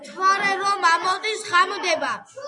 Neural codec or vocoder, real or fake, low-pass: none; real; 10.8 kHz